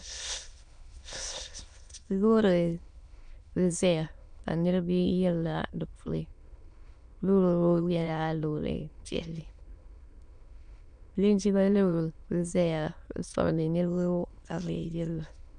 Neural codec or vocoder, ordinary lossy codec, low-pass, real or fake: autoencoder, 22.05 kHz, a latent of 192 numbers a frame, VITS, trained on many speakers; MP3, 96 kbps; 9.9 kHz; fake